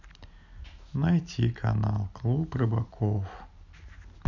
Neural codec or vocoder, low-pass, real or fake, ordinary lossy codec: none; 7.2 kHz; real; none